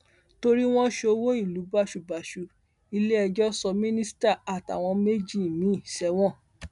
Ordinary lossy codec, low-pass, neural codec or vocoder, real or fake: none; 10.8 kHz; none; real